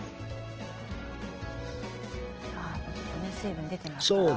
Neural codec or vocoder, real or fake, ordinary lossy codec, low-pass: none; real; Opus, 16 kbps; 7.2 kHz